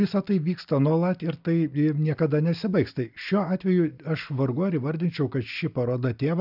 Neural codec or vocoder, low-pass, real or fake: none; 5.4 kHz; real